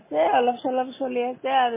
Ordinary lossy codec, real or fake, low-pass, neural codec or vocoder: MP3, 16 kbps; real; 3.6 kHz; none